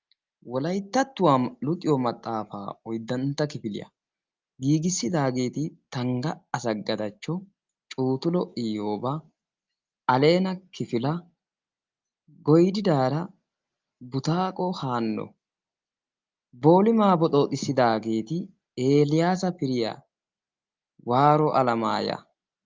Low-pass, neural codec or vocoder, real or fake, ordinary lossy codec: 7.2 kHz; none; real; Opus, 24 kbps